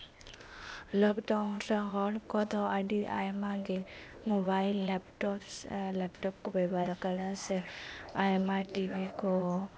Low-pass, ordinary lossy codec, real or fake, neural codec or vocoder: none; none; fake; codec, 16 kHz, 0.8 kbps, ZipCodec